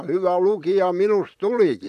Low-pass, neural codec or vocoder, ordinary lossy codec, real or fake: 14.4 kHz; vocoder, 44.1 kHz, 128 mel bands, Pupu-Vocoder; none; fake